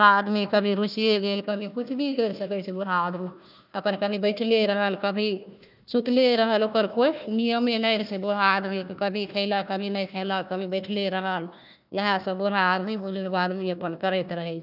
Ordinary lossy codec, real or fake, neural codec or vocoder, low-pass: none; fake; codec, 16 kHz, 1 kbps, FunCodec, trained on Chinese and English, 50 frames a second; 5.4 kHz